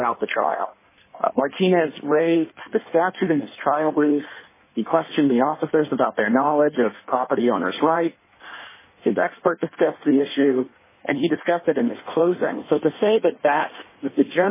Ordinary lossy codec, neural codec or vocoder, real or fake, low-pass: MP3, 16 kbps; codec, 16 kHz in and 24 kHz out, 1.1 kbps, FireRedTTS-2 codec; fake; 3.6 kHz